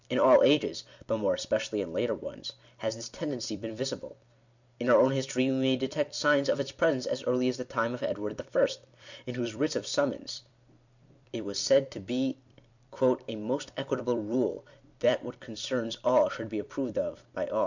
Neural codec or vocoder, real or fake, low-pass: vocoder, 44.1 kHz, 128 mel bands every 256 samples, BigVGAN v2; fake; 7.2 kHz